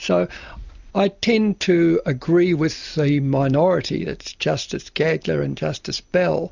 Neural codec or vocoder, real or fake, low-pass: none; real; 7.2 kHz